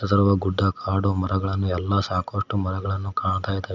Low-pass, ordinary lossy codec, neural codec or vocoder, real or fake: 7.2 kHz; none; none; real